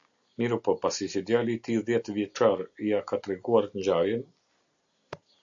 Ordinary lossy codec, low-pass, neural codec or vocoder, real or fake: AAC, 64 kbps; 7.2 kHz; none; real